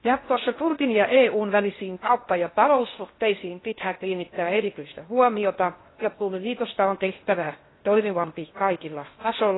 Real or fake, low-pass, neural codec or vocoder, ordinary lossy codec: fake; 7.2 kHz; codec, 16 kHz in and 24 kHz out, 0.6 kbps, FocalCodec, streaming, 2048 codes; AAC, 16 kbps